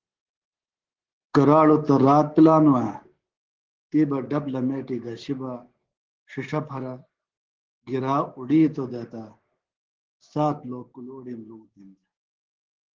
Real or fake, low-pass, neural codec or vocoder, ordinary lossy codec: fake; 7.2 kHz; codec, 16 kHz, 6 kbps, DAC; Opus, 16 kbps